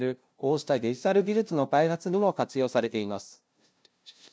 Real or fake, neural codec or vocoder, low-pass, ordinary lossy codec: fake; codec, 16 kHz, 0.5 kbps, FunCodec, trained on LibriTTS, 25 frames a second; none; none